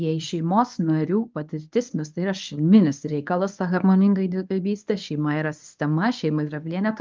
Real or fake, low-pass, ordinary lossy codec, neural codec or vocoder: fake; 7.2 kHz; Opus, 24 kbps; codec, 24 kHz, 0.9 kbps, WavTokenizer, medium speech release version 1